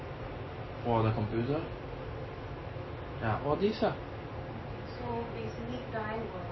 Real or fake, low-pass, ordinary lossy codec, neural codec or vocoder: real; 7.2 kHz; MP3, 24 kbps; none